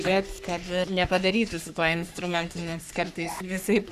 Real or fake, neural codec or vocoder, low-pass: fake; codec, 44.1 kHz, 3.4 kbps, Pupu-Codec; 14.4 kHz